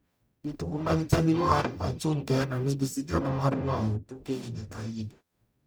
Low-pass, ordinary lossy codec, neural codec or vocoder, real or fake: none; none; codec, 44.1 kHz, 0.9 kbps, DAC; fake